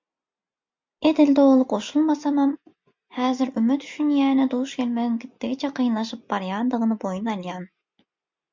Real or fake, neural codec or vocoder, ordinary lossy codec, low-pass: real; none; MP3, 64 kbps; 7.2 kHz